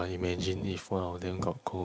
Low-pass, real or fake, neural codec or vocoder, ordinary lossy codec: none; real; none; none